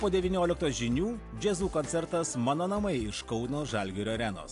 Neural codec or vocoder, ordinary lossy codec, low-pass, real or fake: none; AAC, 64 kbps; 10.8 kHz; real